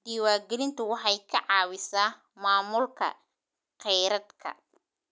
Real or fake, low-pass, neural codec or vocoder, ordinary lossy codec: real; none; none; none